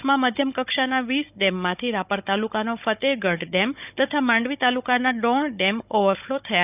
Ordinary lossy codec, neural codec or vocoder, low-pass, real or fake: none; codec, 16 kHz, 16 kbps, FunCodec, trained on Chinese and English, 50 frames a second; 3.6 kHz; fake